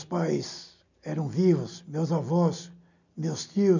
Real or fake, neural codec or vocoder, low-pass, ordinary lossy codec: real; none; 7.2 kHz; none